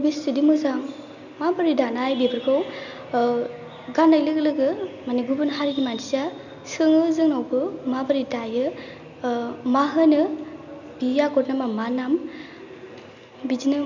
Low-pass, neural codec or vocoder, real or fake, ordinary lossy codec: 7.2 kHz; none; real; none